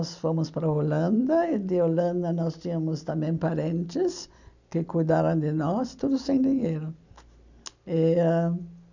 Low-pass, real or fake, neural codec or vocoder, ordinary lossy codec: 7.2 kHz; real; none; none